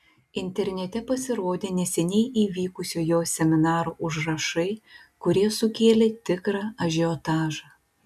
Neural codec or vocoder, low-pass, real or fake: none; 14.4 kHz; real